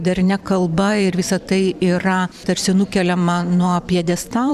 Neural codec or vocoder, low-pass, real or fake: vocoder, 44.1 kHz, 128 mel bands every 512 samples, BigVGAN v2; 14.4 kHz; fake